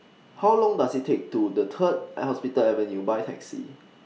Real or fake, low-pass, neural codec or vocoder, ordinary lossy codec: real; none; none; none